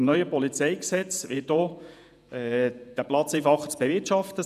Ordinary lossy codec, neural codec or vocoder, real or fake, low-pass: none; vocoder, 48 kHz, 128 mel bands, Vocos; fake; 14.4 kHz